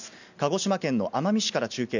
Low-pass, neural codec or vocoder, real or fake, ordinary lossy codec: 7.2 kHz; none; real; none